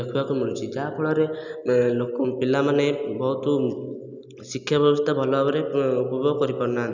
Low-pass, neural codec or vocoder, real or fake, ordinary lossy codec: 7.2 kHz; none; real; none